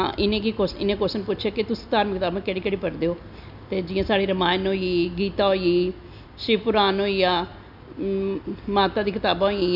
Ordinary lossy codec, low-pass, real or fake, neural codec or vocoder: none; 5.4 kHz; real; none